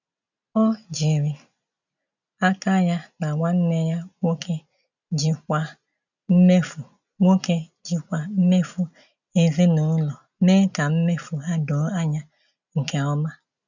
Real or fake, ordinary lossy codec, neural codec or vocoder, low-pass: real; none; none; 7.2 kHz